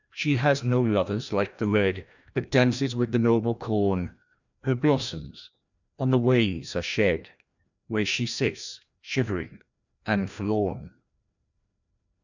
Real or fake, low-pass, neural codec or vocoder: fake; 7.2 kHz; codec, 16 kHz, 1 kbps, FreqCodec, larger model